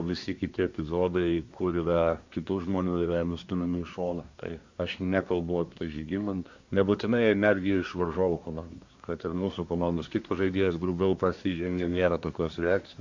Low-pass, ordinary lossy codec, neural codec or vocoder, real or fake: 7.2 kHz; Opus, 64 kbps; codec, 24 kHz, 1 kbps, SNAC; fake